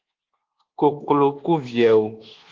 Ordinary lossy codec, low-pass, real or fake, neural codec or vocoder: Opus, 16 kbps; 7.2 kHz; fake; codec, 24 kHz, 1.2 kbps, DualCodec